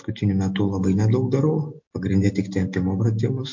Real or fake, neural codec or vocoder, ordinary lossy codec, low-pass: real; none; MP3, 48 kbps; 7.2 kHz